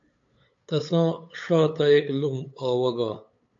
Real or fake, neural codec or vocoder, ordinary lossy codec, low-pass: fake; codec, 16 kHz, 8 kbps, FunCodec, trained on LibriTTS, 25 frames a second; AAC, 48 kbps; 7.2 kHz